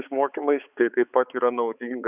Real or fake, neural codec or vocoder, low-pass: fake; codec, 16 kHz, 4 kbps, X-Codec, HuBERT features, trained on balanced general audio; 3.6 kHz